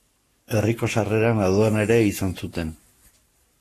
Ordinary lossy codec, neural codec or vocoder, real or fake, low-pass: AAC, 48 kbps; codec, 44.1 kHz, 7.8 kbps, Pupu-Codec; fake; 14.4 kHz